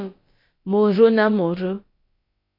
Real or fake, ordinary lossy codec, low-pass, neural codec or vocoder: fake; MP3, 32 kbps; 5.4 kHz; codec, 16 kHz, about 1 kbps, DyCAST, with the encoder's durations